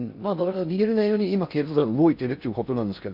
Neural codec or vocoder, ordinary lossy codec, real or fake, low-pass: codec, 16 kHz in and 24 kHz out, 0.6 kbps, FocalCodec, streaming, 4096 codes; none; fake; 5.4 kHz